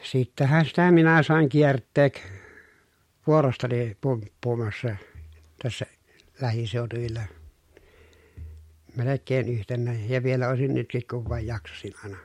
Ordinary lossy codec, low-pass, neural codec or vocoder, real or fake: MP3, 64 kbps; 19.8 kHz; none; real